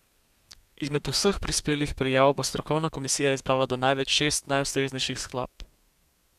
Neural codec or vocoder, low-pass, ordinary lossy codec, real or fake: codec, 32 kHz, 1.9 kbps, SNAC; 14.4 kHz; none; fake